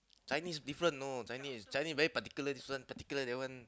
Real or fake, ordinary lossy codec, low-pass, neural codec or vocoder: real; none; none; none